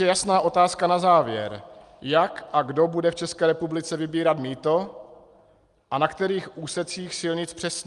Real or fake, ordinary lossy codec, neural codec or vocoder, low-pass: real; Opus, 32 kbps; none; 10.8 kHz